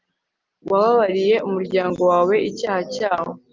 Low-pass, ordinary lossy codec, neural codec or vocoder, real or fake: 7.2 kHz; Opus, 24 kbps; none; real